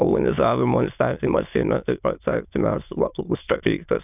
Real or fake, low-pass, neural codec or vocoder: fake; 3.6 kHz; autoencoder, 22.05 kHz, a latent of 192 numbers a frame, VITS, trained on many speakers